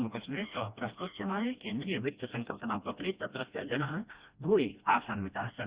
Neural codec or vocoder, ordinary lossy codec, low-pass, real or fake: codec, 16 kHz, 1 kbps, FreqCodec, smaller model; Opus, 64 kbps; 3.6 kHz; fake